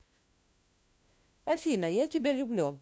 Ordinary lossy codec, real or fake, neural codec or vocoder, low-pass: none; fake; codec, 16 kHz, 1 kbps, FunCodec, trained on LibriTTS, 50 frames a second; none